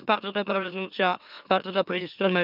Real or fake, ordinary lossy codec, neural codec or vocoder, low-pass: fake; none; autoencoder, 44.1 kHz, a latent of 192 numbers a frame, MeloTTS; 5.4 kHz